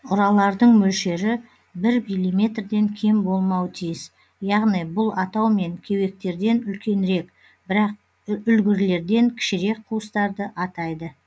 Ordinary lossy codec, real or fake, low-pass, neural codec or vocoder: none; real; none; none